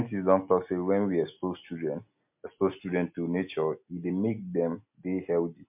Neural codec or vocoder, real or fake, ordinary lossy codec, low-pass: none; real; MP3, 32 kbps; 3.6 kHz